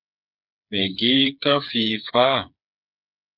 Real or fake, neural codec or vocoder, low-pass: fake; codec, 16 kHz, 4 kbps, FreqCodec, smaller model; 5.4 kHz